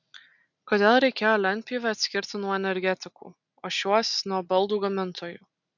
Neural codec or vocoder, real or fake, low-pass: none; real; 7.2 kHz